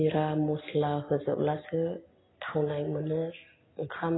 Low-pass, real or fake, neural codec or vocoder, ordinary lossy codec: 7.2 kHz; real; none; AAC, 16 kbps